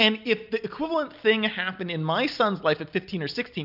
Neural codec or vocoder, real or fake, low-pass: none; real; 5.4 kHz